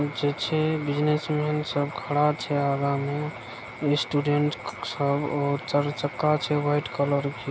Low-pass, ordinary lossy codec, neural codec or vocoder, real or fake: none; none; none; real